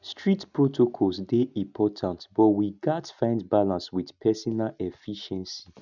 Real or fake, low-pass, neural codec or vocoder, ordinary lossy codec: real; 7.2 kHz; none; none